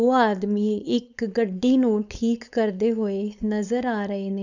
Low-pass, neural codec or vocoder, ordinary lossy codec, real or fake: 7.2 kHz; codec, 16 kHz, 4.8 kbps, FACodec; none; fake